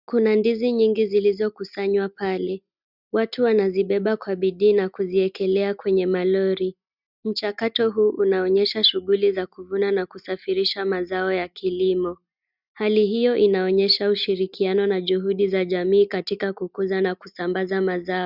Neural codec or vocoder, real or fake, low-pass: none; real; 5.4 kHz